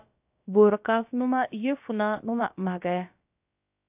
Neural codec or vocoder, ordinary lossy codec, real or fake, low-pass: codec, 16 kHz, about 1 kbps, DyCAST, with the encoder's durations; AAC, 32 kbps; fake; 3.6 kHz